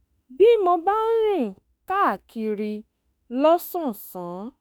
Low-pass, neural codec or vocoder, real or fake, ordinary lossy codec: none; autoencoder, 48 kHz, 32 numbers a frame, DAC-VAE, trained on Japanese speech; fake; none